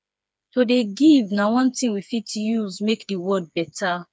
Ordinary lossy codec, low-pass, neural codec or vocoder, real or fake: none; none; codec, 16 kHz, 4 kbps, FreqCodec, smaller model; fake